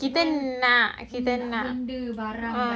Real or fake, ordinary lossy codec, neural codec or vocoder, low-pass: real; none; none; none